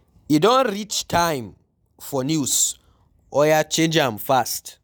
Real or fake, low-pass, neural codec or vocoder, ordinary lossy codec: real; none; none; none